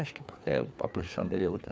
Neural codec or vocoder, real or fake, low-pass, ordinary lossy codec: codec, 16 kHz, 4 kbps, FreqCodec, larger model; fake; none; none